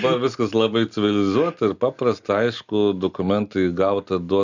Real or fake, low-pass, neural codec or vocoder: real; 7.2 kHz; none